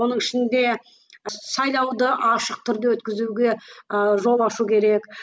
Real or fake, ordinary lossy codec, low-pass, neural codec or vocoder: real; none; none; none